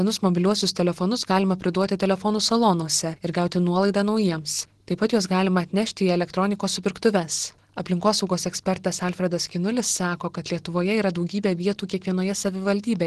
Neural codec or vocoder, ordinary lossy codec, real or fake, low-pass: none; Opus, 16 kbps; real; 10.8 kHz